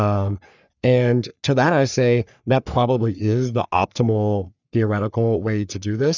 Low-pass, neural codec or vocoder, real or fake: 7.2 kHz; codec, 44.1 kHz, 3.4 kbps, Pupu-Codec; fake